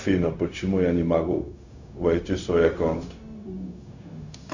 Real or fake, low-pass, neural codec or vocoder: fake; 7.2 kHz; codec, 16 kHz, 0.4 kbps, LongCat-Audio-Codec